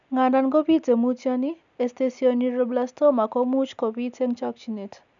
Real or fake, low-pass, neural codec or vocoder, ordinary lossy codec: real; 7.2 kHz; none; none